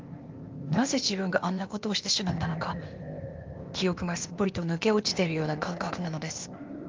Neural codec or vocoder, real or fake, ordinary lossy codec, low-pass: codec, 16 kHz, 0.8 kbps, ZipCodec; fake; Opus, 32 kbps; 7.2 kHz